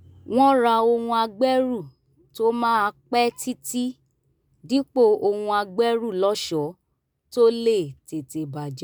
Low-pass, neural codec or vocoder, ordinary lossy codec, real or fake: none; none; none; real